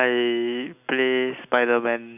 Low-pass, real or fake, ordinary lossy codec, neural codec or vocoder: 3.6 kHz; real; none; none